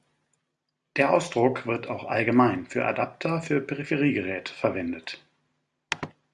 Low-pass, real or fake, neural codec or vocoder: 10.8 kHz; real; none